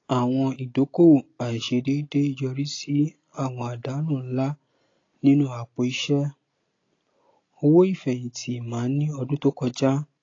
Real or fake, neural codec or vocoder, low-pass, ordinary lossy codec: real; none; 7.2 kHz; AAC, 32 kbps